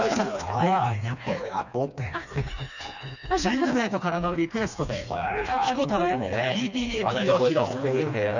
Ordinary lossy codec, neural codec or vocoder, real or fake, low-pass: none; codec, 16 kHz, 2 kbps, FreqCodec, smaller model; fake; 7.2 kHz